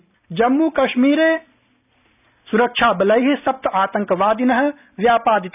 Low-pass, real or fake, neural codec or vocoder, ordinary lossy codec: 3.6 kHz; real; none; none